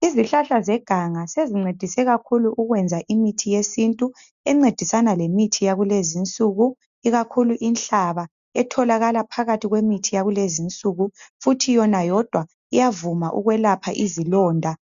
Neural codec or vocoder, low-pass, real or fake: none; 7.2 kHz; real